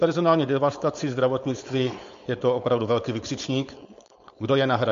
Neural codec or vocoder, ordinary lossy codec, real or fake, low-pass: codec, 16 kHz, 4.8 kbps, FACodec; MP3, 48 kbps; fake; 7.2 kHz